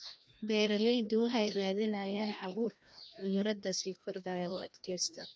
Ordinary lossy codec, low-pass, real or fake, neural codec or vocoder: none; none; fake; codec, 16 kHz, 1 kbps, FreqCodec, larger model